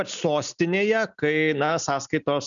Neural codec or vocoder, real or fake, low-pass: none; real; 7.2 kHz